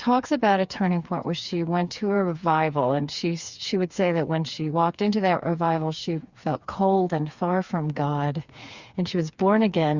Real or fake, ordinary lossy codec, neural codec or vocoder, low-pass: fake; Opus, 64 kbps; codec, 16 kHz, 4 kbps, FreqCodec, smaller model; 7.2 kHz